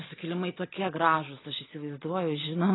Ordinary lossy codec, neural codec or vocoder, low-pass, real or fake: AAC, 16 kbps; none; 7.2 kHz; real